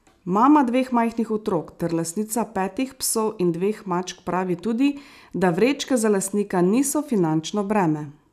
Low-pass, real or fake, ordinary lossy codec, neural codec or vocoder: 14.4 kHz; real; none; none